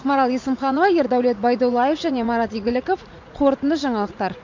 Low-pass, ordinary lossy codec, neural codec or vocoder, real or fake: 7.2 kHz; MP3, 48 kbps; vocoder, 44.1 kHz, 80 mel bands, Vocos; fake